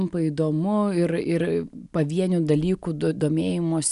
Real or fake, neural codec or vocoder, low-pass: real; none; 10.8 kHz